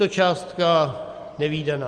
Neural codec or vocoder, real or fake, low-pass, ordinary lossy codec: none; real; 9.9 kHz; Opus, 32 kbps